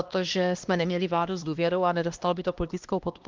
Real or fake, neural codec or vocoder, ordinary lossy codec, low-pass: fake; codec, 16 kHz, 1 kbps, X-Codec, HuBERT features, trained on LibriSpeech; Opus, 32 kbps; 7.2 kHz